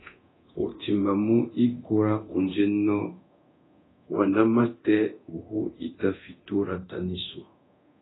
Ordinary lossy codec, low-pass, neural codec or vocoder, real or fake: AAC, 16 kbps; 7.2 kHz; codec, 24 kHz, 0.9 kbps, DualCodec; fake